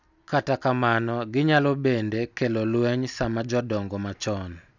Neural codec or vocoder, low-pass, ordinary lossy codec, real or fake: none; 7.2 kHz; none; real